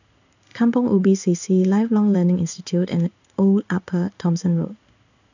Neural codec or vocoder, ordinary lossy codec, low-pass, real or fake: codec, 16 kHz in and 24 kHz out, 1 kbps, XY-Tokenizer; none; 7.2 kHz; fake